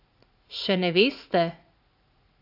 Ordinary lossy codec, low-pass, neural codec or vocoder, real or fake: AAC, 48 kbps; 5.4 kHz; vocoder, 44.1 kHz, 80 mel bands, Vocos; fake